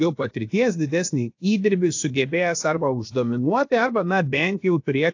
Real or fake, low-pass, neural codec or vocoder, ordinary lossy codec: fake; 7.2 kHz; codec, 16 kHz, 0.7 kbps, FocalCodec; AAC, 48 kbps